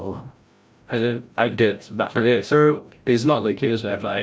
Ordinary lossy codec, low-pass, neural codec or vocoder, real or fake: none; none; codec, 16 kHz, 0.5 kbps, FreqCodec, larger model; fake